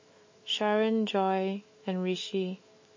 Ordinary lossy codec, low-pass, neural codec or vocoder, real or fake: MP3, 32 kbps; 7.2 kHz; autoencoder, 48 kHz, 128 numbers a frame, DAC-VAE, trained on Japanese speech; fake